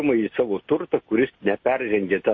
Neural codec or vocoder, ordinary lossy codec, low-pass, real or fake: none; MP3, 32 kbps; 7.2 kHz; real